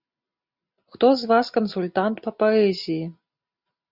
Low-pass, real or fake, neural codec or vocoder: 5.4 kHz; real; none